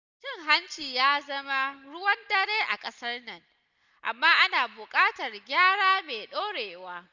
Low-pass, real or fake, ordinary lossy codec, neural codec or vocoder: 7.2 kHz; real; none; none